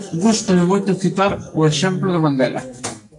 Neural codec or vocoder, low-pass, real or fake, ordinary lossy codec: codec, 44.1 kHz, 2.6 kbps, SNAC; 10.8 kHz; fake; AAC, 64 kbps